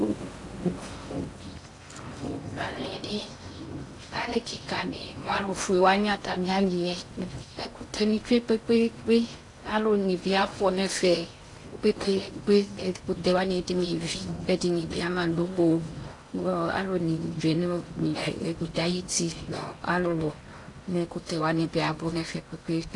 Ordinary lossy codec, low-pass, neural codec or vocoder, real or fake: AAC, 48 kbps; 10.8 kHz; codec, 16 kHz in and 24 kHz out, 0.6 kbps, FocalCodec, streaming, 4096 codes; fake